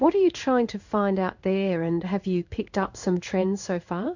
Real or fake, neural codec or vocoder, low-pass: fake; codec, 16 kHz in and 24 kHz out, 1 kbps, XY-Tokenizer; 7.2 kHz